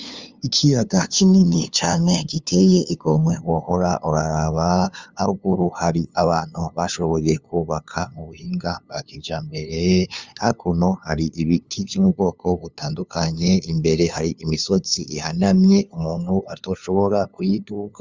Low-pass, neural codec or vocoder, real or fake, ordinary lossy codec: 7.2 kHz; codec, 16 kHz, 2 kbps, FunCodec, trained on LibriTTS, 25 frames a second; fake; Opus, 32 kbps